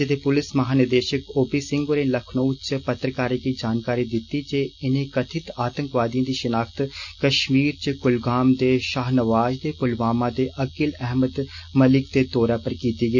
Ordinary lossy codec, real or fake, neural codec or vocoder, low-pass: none; real; none; 7.2 kHz